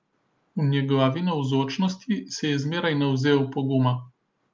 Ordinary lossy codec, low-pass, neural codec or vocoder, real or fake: Opus, 24 kbps; 7.2 kHz; none; real